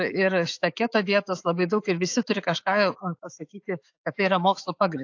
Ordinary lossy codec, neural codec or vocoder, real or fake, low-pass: AAC, 48 kbps; autoencoder, 48 kHz, 128 numbers a frame, DAC-VAE, trained on Japanese speech; fake; 7.2 kHz